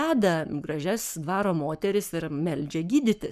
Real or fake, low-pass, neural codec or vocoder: fake; 14.4 kHz; codec, 44.1 kHz, 7.8 kbps, Pupu-Codec